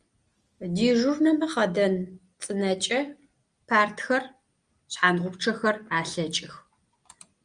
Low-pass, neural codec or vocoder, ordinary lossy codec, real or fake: 9.9 kHz; none; Opus, 32 kbps; real